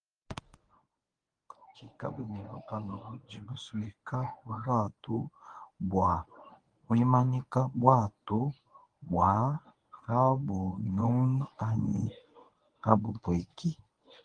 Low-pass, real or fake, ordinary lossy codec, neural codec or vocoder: 9.9 kHz; fake; Opus, 24 kbps; codec, 24 kHz, 0.9 kbps, WavTokenizer, medium speech release version 1